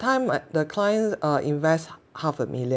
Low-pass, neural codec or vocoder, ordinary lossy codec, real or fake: none; none; none; real